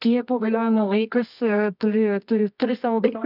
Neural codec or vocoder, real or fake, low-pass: codec, 24 kHz, 0.9 kbps, WavTokenizer, medium music audio release; fake; 5.4 kHz